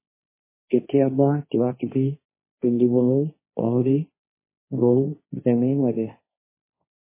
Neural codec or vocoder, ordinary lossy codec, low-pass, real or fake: codec, 16 kHz, 1.1 kbps, Voila-Tokenizer; MP3, 16 kbps; 3.6 kHz; fake